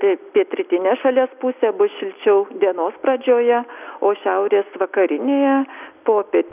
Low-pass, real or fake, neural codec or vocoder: 3.6 kHz; real; none